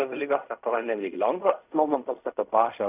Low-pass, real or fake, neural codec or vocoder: 3.6 kHz; fake; codec, 16 kHz in and 24 kHz out, 0.4 kbps, LongCat-Audio-Codec, fine tuned four codebook decoder